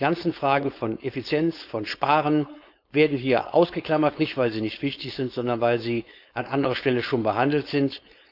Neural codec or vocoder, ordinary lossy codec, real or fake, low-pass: codec, 16 kHz, 4.8 kbps, FACodec; none; fake; 5.4 kHz